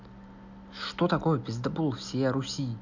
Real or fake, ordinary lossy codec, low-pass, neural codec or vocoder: real; none; 7.2 kHz; none